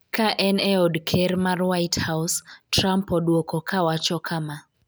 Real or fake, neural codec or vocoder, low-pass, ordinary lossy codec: real; none; none; none